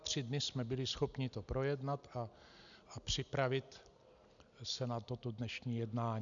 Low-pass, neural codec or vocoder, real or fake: 7.2 kHz; none; real